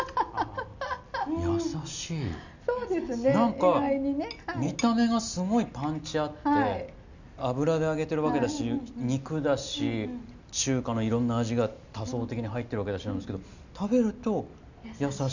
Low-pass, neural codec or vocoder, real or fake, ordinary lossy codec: 7.2 kHz; none; real; none